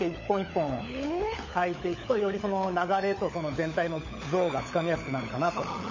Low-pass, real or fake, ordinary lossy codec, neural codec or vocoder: 7.2 kHz; fake; MP3, 32 kbps; codec, 16 kHz, 16 kbps, FunCodec, trained on LibriTTS, 50 frames a second